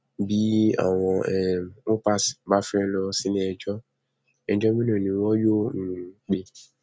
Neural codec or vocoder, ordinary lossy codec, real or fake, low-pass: none; none; real; none